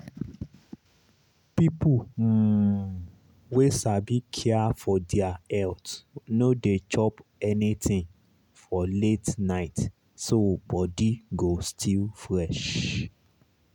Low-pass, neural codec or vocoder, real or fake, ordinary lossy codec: 19.8 kHz; none; real; none